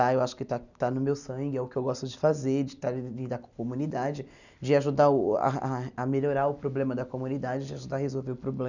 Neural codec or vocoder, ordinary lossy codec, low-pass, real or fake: none; none; 7.2 kHz; real